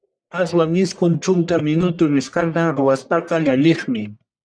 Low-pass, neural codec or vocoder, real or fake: 9.9 kHz; codec, 44.1 kHz, 1.7 kbps, Pupu-Codec; fake